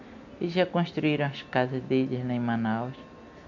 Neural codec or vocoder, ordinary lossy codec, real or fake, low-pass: none; none; real; 7.2 kHz